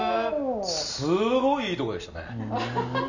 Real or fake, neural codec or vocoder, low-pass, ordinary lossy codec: real; none; 7.2 kHz; none